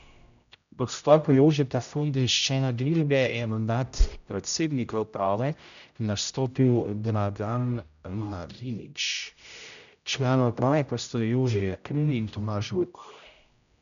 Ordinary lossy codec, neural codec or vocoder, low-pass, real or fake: none; codec, 16 kHz, 0.5 kbps, X-Codec, HuBERT features, trained on general audio; 7.2 kHz; fake